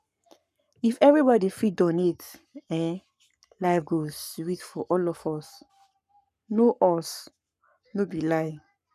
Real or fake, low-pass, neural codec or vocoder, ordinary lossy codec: fake; 14.4 kHz; codec, 44.1 kHz, 7.8 kbps, Pupu-Codec; none